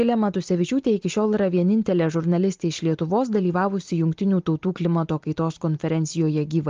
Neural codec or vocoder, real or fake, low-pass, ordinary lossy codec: none; real; 7.2 kHz; Opus, 32 kbps